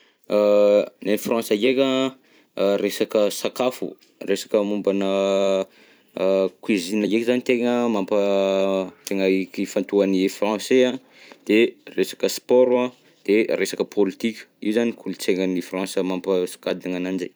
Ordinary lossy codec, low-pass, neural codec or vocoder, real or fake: none; none; vocoder, 44.1 kHz, 128 mel bands every 256 samples, BigVGAN v2; fake